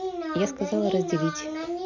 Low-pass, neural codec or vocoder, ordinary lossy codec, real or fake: 7.2 kHz; none; none; real